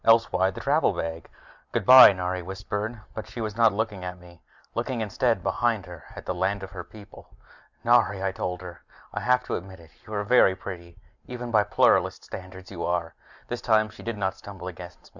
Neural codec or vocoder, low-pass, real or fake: none; 7.2 kHz; real